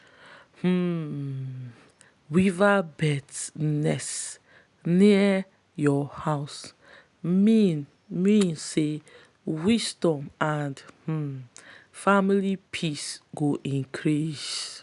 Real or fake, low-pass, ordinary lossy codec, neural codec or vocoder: real; 10.8 kHz; none; none